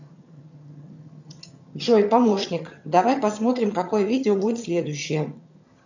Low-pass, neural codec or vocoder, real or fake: 7.2 kHz; vocoder, 22.05 kHz, 80 mel bands, HiFi-GAN; fake